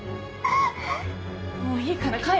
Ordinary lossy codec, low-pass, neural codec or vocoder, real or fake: none; none; none; real